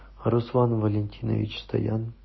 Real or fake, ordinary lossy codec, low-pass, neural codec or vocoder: real; MP3, 24 kbps; 7.2 kHz; none